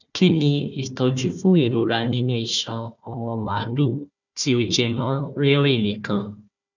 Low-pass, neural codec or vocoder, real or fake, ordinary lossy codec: 7.2 kHz; codec, 16 kHz, 1 kbps, FunCodec, trained on Chinese and English, 50 frames a second; fake; none